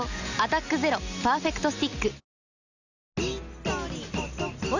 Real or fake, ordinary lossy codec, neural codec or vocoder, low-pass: real; none; none; 7.2 kHz